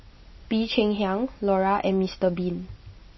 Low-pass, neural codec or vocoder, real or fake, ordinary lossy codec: 7.2 kHz; none; real; MP3, 24 kbps